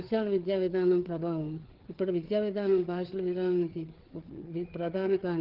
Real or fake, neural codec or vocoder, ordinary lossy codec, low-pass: fake; codec, 16 kHz, 4 kbps, FreqCodec, larger model; Opus, 16 kbps; 5.4 kHz